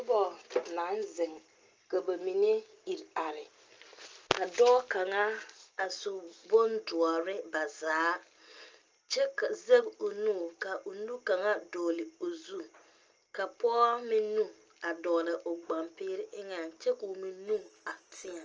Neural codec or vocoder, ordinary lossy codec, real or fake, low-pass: none; Opus, 24 kbps; real; 7.2 kHz